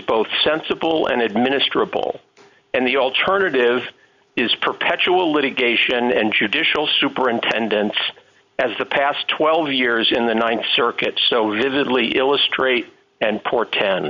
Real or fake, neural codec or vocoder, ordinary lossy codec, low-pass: real; none; Opus, 64 kbps; 7.2 kHz